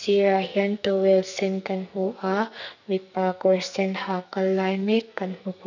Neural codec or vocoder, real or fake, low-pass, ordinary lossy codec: codec, 44.1 kHz, 2.6 kbps, SNAC; fake; 7.2 kHz; none